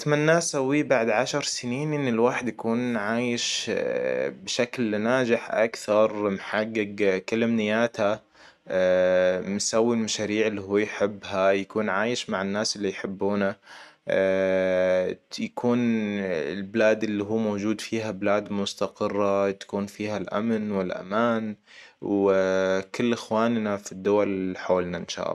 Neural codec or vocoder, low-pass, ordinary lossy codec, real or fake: none; 14.4 kHz; none; real